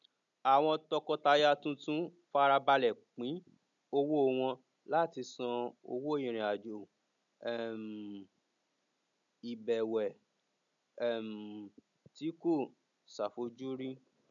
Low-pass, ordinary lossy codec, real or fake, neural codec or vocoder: 7.2 kHz; none; real; none